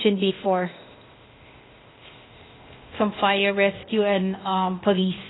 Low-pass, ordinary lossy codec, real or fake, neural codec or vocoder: 7.2 kHz; AAC, 16 kbps; fake; codec, 16 kHz, 0.8 kbps, ZipCodec